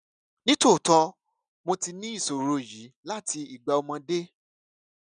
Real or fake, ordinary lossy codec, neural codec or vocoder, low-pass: real; none; none; 9.9 kHz